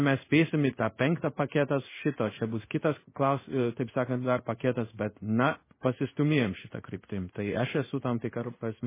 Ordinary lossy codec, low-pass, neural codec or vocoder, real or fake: MP3, 16 kbps; 3.6 kHz; codec, 16 kHz in and 24 kHz out, 1 kbps, XY-Tokenizer; fake